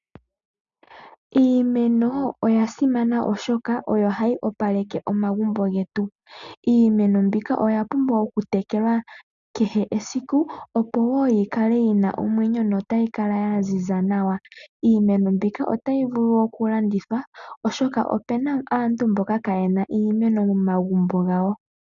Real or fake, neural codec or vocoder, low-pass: real; none; 7.2 kHz